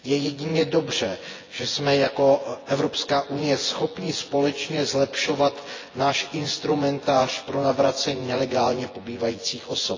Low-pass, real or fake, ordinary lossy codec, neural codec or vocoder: 7.2 kHz; fake; AAC, 32 kbps; vocoder, 24 kHz, 100 mel bands, Vocos